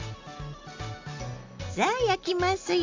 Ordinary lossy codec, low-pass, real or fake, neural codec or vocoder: MP3, 64 kbps; 7.2 kHz; real; none